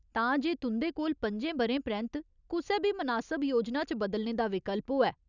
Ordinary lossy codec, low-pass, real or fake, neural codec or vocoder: none; 7.2 kHz; real; none